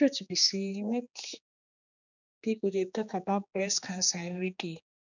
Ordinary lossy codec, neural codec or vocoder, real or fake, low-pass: none; codec, 16 kHz, 2 kbps, X-Codec, HuBERT features, trained on general audio; fake; 7.2 kHz